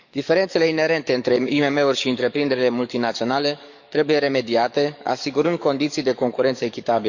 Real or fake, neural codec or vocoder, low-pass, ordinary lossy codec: fake; codec, 44.1 kHz, 7.8 kbps, DAC; 7.2 kHz; none